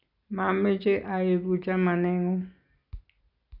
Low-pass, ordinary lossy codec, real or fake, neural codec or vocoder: 5.4 kHz; none; real; none